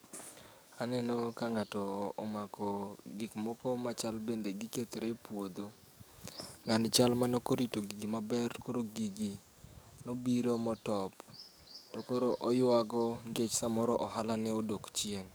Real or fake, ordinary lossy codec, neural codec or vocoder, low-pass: fake; none; codec, 44.1 kHz, 7.8 kbps, DAC; none